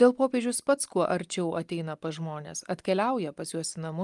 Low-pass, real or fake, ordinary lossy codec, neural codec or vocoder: 10.8 kHz; real; Opus, 32 kbps; none